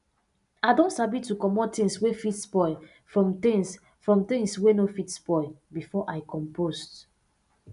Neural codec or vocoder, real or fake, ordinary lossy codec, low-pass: none; real; none; 10.8 kHz